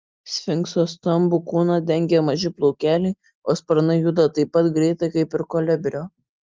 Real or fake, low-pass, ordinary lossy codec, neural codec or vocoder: real; 7.2 kHz; Opus, 24 kbps; none